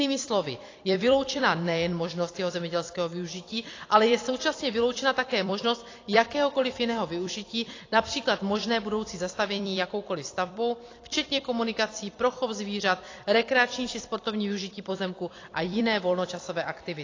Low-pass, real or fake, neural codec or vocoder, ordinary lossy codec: 7.2 kHz; fake; vocoder, 44.1 kHz, 128 mel bands every 256 samples, BigVGAN v2; AAC, 32 kbps